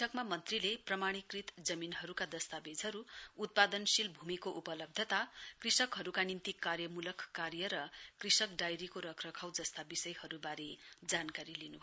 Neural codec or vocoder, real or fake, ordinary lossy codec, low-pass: none; real; none; none